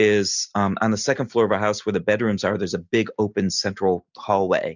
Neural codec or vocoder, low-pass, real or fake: none; 7.2 kHz; real